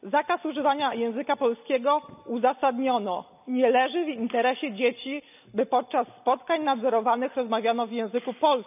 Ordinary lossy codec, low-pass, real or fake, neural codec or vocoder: none; 3.6 kHz; real; none